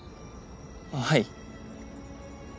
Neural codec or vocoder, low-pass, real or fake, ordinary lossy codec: none; none; real; none